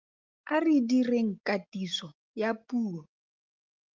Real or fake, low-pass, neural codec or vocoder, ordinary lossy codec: real; 7.2 kHz; none; Opus, 32 kbps